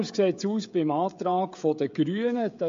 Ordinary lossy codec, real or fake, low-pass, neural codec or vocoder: MP3, 48 kbps; fake; 7.2 kHz; codec, 16 kHz, 16 kbps, FreqCodec, smaller model